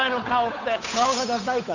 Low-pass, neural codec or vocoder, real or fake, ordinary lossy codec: 7.2 kHz; codec, 16 kHz, 8 kbps, FunCodec, trained on Chinese and English, 25 frames a second; fake; none